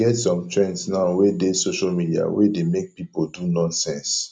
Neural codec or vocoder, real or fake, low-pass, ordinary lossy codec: none; real; none; none